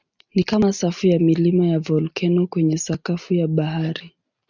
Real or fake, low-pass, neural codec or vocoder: real; 7.2 kHz; none